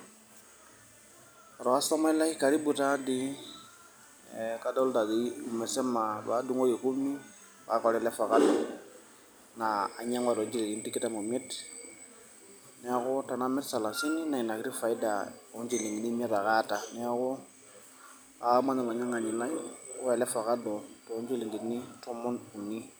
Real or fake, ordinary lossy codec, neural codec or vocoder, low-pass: real; none; none; none